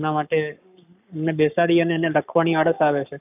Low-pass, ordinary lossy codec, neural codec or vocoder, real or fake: 3.6 kHz; none; codec, 44.1 kHz, 7.8 kbps, Pupu-Codec; fake